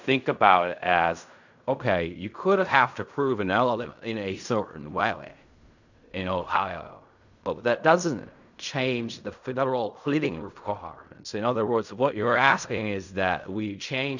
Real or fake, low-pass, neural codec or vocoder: fake; 7.2 kHz; codec, 16 kHz in and 24 kHz out, 0.4 kbps, LongCat-Audio-Codec, fine tuned four codebook decoder